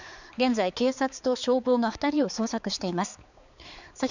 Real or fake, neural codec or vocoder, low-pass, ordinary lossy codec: fake; codec, 16 kHz, 4 kbps, X-Codec, HuBERT features, trained on balanced general audio; 7.2 kHz; none